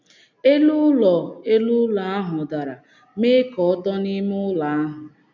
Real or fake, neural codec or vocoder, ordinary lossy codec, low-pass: real; none; none; 7.2 kHz